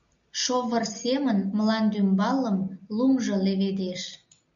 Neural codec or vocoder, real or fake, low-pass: none; real; 7.2 kHz